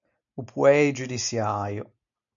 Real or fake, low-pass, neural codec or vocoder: real; 7.2 kHz; none